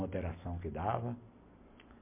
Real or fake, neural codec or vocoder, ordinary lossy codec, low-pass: real; none; MP3, 32 kbps; 3.6 kHz